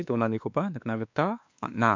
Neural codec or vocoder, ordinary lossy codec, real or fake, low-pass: codec, 16 kHz, 2 kbps, X-Codec, WavLM features, trained on Multilingual LibriSpeech; MP3, 64 kbps; fake; 7.2 kHz